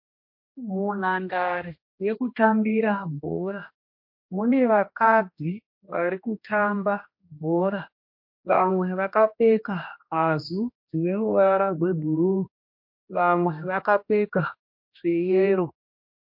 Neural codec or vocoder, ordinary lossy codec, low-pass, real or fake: codec, 16 kHz, 1 kbps, X-Codec, HuBERT features, trained on general audio; MP3, 32 kbps; 5.4 kHz; fake